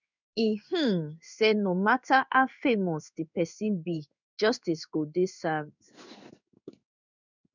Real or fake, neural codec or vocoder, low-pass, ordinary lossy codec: fake; codec, 16 kHz in and 24 kHz out, 1 kbps, XY-Tokenizer; 7.2 kHz; none